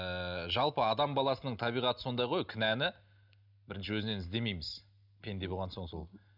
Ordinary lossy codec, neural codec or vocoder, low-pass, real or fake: none; none; 5.4 kHz; real